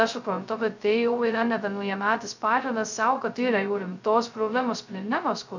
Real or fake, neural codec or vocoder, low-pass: fake; codec, 16 kHz, 0.2 kbps, FocalCodec; 7.2 kHz